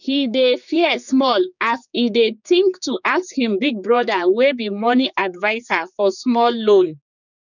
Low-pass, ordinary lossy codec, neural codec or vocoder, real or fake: 7.2 kHz; none; codec, 16 kHz, 4 kbps, X-Codec, HuBERT features, trained on general audio; fake